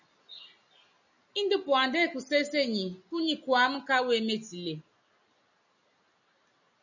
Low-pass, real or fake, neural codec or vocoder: 7.2 kHz; real; none